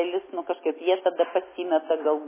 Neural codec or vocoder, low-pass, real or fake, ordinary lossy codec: none; 3.6 kHz; real; MP3, 16 kbps